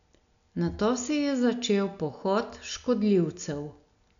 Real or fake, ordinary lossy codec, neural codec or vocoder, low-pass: real; none; none; 7.2 kHz